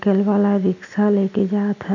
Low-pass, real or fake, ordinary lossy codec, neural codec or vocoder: 7.2 kHz; real; none; none